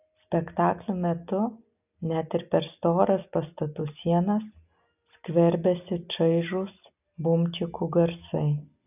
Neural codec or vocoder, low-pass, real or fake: none; 3.6 kHz; real